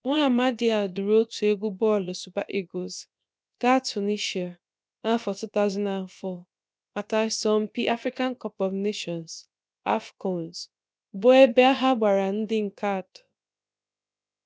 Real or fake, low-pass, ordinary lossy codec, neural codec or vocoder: fake; none; none; codec, 16 kHz, about 1 kbps, DyCAST, with the encoder's durations